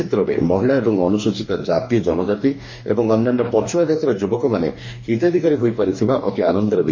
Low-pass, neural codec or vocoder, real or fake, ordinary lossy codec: 7.2 kHz; codec, 44.1 kHz, 2.6 kbps, DAC; fake; MP3, 32 kbps